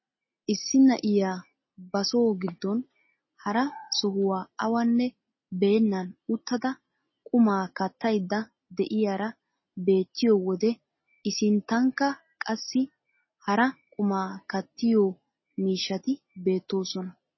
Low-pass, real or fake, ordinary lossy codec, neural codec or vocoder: 7.2 kHz; real; MP3, 24 kbps; none